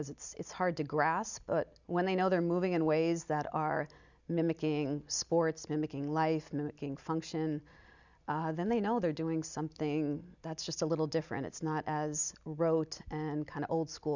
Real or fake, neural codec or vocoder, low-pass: real; none; 7.2 kHz